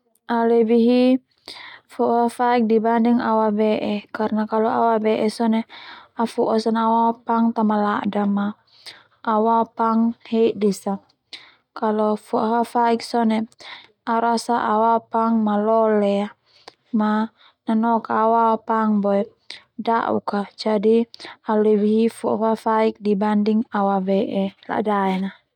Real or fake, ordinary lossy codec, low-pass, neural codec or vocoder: real; none; 19.8 kHz; none